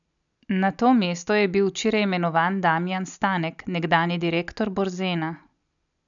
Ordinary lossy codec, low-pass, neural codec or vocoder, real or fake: none; 7.2 kHz; none; real